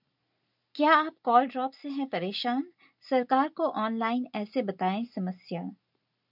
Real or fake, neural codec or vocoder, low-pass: real; none; 5.4 kHz